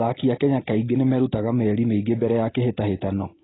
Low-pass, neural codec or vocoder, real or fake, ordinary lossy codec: 7.2 kHz; none; real; AAC, 16 kbps